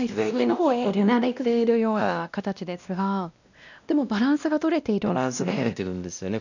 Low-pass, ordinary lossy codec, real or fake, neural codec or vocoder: 7.2 kHz; none; fake; codec, 16 kHz, 1 kbps, X-Codec, WavLM features, trained on Multilingual LibriSpeech